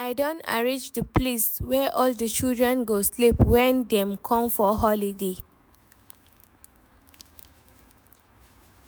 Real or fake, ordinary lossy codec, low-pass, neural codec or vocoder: fake; none; none; autoencoder, 48 kHz, 128 numbers a frame, DAC-VAE, trained on Japanese speech